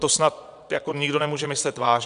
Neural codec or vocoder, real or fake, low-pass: vocoder, 22.05 kHz, 80 mel bands, Vocos; fake; 9.9 kHz